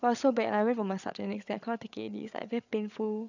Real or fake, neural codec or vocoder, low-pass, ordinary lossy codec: fake; codec, 16 kHz, 8 kbps, FreqCodec, larger model; 7.2 kHz; none